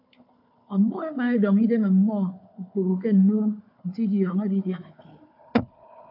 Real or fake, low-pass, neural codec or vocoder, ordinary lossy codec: fake; 5.4 kHz; codec, 16 kHz, 4 kbps, FunCodec, trained on Chinese and English, 50 frames a second; AAC, 48 kbps